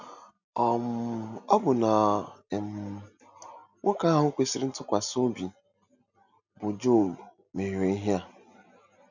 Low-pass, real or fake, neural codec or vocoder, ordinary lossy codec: 7.2 kHz; real; none; none